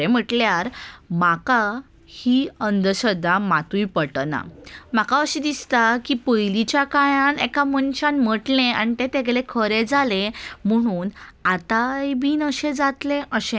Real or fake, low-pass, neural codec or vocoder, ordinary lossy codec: real; none; none; none